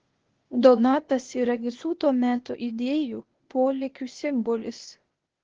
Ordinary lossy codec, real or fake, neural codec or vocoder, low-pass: Opus, 16 kbps; fake; codec, 16 kHz, 0.8 kbps, ZipCodec; 7.2 kHz